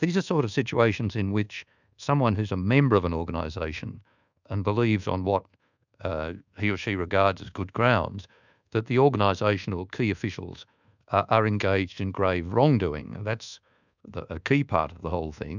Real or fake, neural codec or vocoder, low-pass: fake; codec, 24 kHz, 1.2 kbps, DualCodec; 7.2 kHz